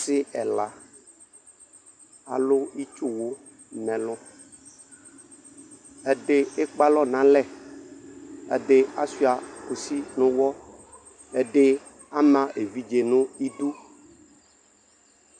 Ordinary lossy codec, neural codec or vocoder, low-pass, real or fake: MP3, 96 kbps; none; 9.9 kHz; real